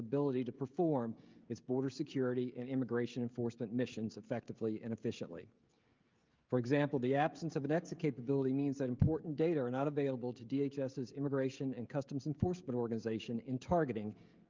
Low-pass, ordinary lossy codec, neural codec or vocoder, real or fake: 7.2 kHz; Opus, 24 kbps; codec, 16 kHz, 16 kbps, FreqCodec, smaller model; fake